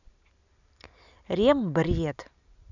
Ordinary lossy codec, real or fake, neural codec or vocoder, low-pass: none; real; none; 7.2 kHz